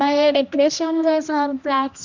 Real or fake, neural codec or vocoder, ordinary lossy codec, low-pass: fake; codec, 16 kHz, 1 kbps, X-Codec, HuBERT features, trained on general audio; none; 7.2 kHz